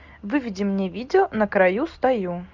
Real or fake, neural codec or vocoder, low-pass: real; none; 7.2 kHz